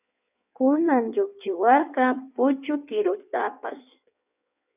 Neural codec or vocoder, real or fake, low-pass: codec, 16 kHz in and 24 kHz out, 1.1 kbps, FireRedTTS-2 codec; fake; 3.6 kHz